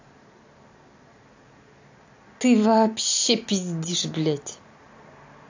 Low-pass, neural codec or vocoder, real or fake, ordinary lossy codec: 7.2 kHz; none; real; AAC, 48 kbps